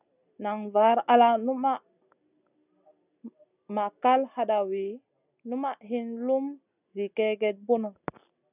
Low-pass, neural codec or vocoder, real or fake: 3.6 kHz; none; real